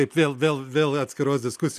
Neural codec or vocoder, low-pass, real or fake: none; 14.4 kHz; real